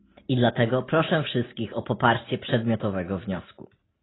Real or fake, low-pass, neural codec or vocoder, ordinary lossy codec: real; 7.2 kHz; none; AAC, 16 kbps